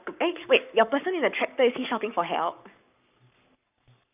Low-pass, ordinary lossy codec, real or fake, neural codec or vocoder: 3.6 kHz; none; fake; codec, 44.1 kHz, 7.8 kbps, Pupu-Codec